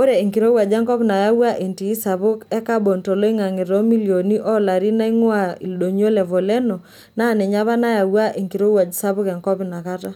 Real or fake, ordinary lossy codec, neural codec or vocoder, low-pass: real; none; none; 19.8 kHz